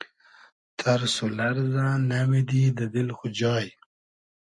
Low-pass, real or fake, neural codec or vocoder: 10.8 kHz; real; none